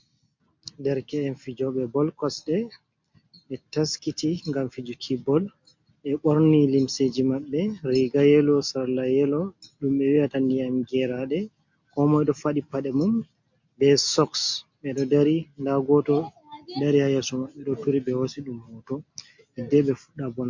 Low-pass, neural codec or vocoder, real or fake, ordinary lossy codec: 7.2 kHz; none; real; MP3, 48 kbps